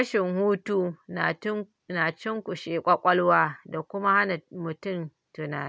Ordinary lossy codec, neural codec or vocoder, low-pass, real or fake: none; none; none; real